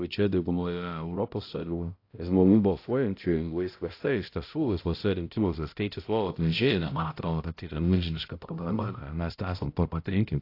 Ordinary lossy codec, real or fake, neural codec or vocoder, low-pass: AAC, 32 kbps; fake; codec, 16 kHz, 0.5 kbps, X-Codec, HuBERT features, trained on balanced general audio; 5.4 kHz